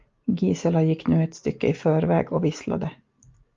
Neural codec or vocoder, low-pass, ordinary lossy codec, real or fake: none; 7.2 kHz; Opus, 32 kbps; real